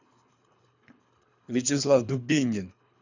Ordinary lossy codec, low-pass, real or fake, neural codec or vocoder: none; 7.2 kHz; fake; codec, 24 kHz, 3 kbps, HILCodec